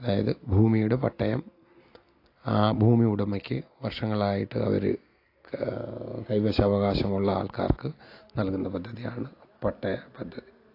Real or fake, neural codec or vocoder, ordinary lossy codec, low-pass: real; none; AAC, 32 kbps; 5.4 kHz